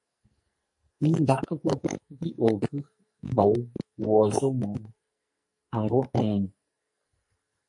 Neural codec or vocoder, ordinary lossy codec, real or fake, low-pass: codec, 44.1 kHz, 2.6 kbps, SNAC; MP3, 48 kbps; fake; 10.8 kHz